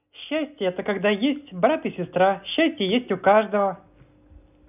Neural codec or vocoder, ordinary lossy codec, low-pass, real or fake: none; none; 3.6 kHz; real